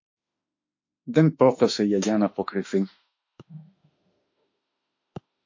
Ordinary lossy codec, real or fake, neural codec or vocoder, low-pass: MP3, 48 kbps; fake; autoencoder, 48 kHz, 32 numbers a frame, DAC-VAE, trained on Japanese speech; 7.2 kHz